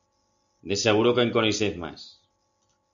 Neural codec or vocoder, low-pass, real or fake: none; 7.2 kHz; real